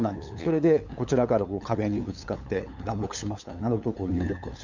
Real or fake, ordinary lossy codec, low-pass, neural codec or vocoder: fake; none; 7.2 kHz; codec, 16 kHz, 8 kbps, FunCodec, trained on LibriTTS, 25 frames a second